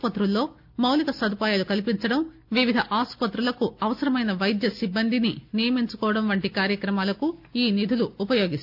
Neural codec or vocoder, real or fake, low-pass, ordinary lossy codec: none; real; 5.4 kHz; none